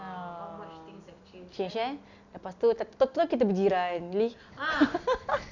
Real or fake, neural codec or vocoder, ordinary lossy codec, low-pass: real; none; none; 7.2 kHz